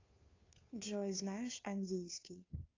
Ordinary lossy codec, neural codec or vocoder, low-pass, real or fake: AAC, 32 kbps; codec, 16 kHz, 2 kbps, FunCodec, trained on Chinese and English, 25 frames a second; 7.2 kHz; fake